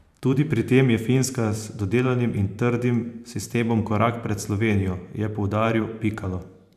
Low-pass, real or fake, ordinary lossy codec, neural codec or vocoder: 14.4 kHz; fake; none; vocoder, 44.1 kHz, 128 mel bands every 512 samples, BigVGAN v2